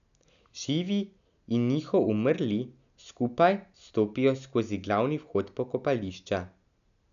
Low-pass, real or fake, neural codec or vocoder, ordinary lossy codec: 7.2 kHz; real; none; none